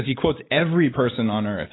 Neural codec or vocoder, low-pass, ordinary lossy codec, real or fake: none; 7.2 kHz; AAC, 16 kbps; real